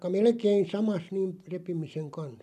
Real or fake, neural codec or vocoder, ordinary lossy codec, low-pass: real; none; none; 14.4 kHz